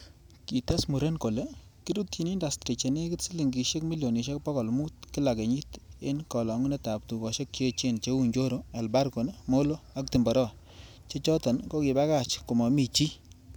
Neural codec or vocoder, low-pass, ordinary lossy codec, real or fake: none; none; none; real